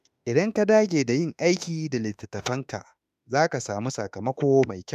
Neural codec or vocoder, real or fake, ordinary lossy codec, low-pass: autoencoder, 48 kHz, 32 numbers a frame, DAC-VAE, trained on Japanese speech; fake; none; 14.4 kHz